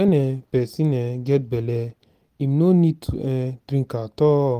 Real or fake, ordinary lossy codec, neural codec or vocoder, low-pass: real; Opus, 32 kbps; none; 14.4 kHz